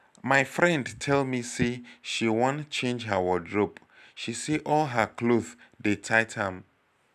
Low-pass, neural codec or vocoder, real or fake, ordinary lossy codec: 14.4 kHz; none; real; none